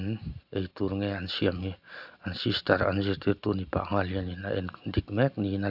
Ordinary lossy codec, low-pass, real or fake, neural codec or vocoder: none; 5.4 kHz; real; none